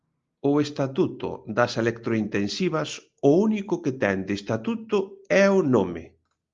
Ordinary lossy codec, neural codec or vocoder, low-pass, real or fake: Opus, 32 kbps; none; 7.2 kHz; real